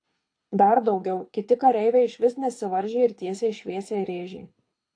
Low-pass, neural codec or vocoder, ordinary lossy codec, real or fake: 9.9 kHz; codec, 24 kHz, 6 kbps, HILCodec; AAC, 48 kbps; fake